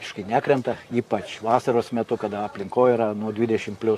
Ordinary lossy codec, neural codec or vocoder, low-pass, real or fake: AAC, 64 kbps; vocoder, 44.1 kHz, 128 mel bands, Pupu-Vocoder; 14.4 kHz; fake